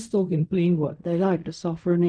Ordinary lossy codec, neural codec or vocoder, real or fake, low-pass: none; codec, 16 kHz in and 24 kHz out, 0.4 kbps, LongCat-Audio-Codec, fine tuned four codebook decoder; fake; 9.9 kHz